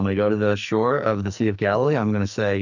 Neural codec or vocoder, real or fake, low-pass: codec, 44.1 kHz, 2.6 kbps, SNAC; fake; 7.2 kHz